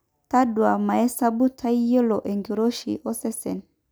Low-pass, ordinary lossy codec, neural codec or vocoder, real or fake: none; none; none; real